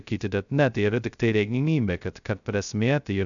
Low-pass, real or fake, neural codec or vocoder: 7.2 kHz; fake; codec, 16 kHz, 0.2 kbps, FocalCodec